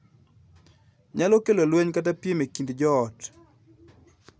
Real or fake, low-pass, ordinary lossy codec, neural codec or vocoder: real; none; none; none